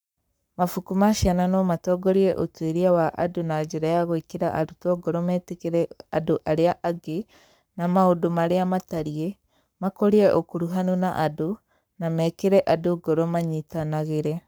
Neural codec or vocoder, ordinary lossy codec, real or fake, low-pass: codec, 44.1 kHz, 7.8 kbps, Pupu-Codec; none; fake; none